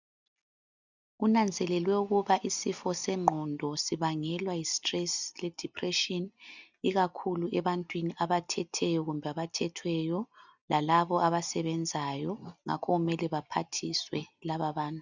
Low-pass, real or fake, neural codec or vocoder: 7.2 kHz; real; none